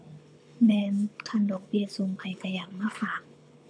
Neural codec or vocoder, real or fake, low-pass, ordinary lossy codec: codec, 24 kHz, 6 kbps, HILCodec; fake; 9.9 kHz; none